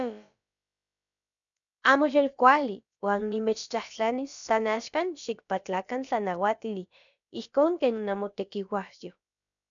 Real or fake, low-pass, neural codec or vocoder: fake; 7.2 kHz; codec, 16 kHz, about 1 kbps, DyCAST, with the encoder's durations